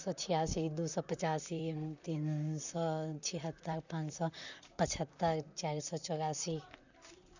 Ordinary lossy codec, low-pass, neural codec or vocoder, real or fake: none; 7.2 kHz; vocoder, 44.1 kHz, 128 mel bands, Pupu-Vocoder; fake